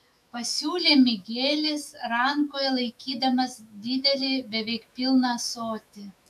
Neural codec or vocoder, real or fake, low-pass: autoencoder, 48 kHz, 128 numbers a frame, DAC-VAE, trained on Japanese speech; fake; 14.4 kHz